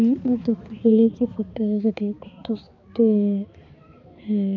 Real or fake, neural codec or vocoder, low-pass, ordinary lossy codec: fake; codec, 16 kHz, 4 kbps, X-Codec, HuBERT features, trained on balanced general audio; 7.2 kHz; MP3, 48 kbps